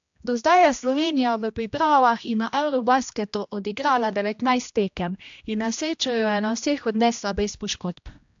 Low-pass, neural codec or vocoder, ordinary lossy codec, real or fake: 7.2 kHz; codec, 16 kHz, 1 kbps, X-Codec, HuBERT features, trained on general audio; AAC, 64 kbps; fake